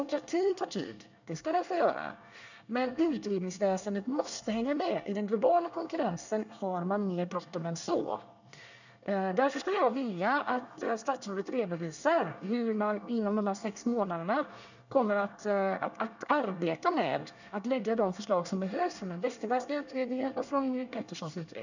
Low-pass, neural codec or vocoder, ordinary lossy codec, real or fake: 7.2 kHz; codec, 24 kHz, 1 kbps, SNAC; none; fake